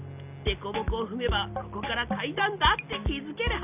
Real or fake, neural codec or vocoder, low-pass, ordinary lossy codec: real; none; 3.6 kHz; none